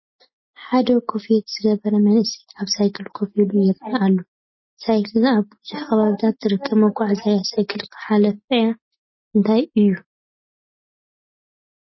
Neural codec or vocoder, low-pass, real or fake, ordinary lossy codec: none; 7.2 kHz; real; MP3, 24 kbps